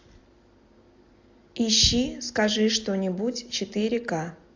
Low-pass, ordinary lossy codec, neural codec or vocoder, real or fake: 7.2 kHz; AAC, 48 kbps; none; real